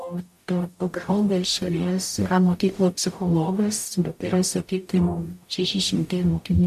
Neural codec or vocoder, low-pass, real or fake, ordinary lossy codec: codec, 44.1 kHz, 0.9 kbps, DAC; 14.4 kHz; fake; AAC, 96 kbps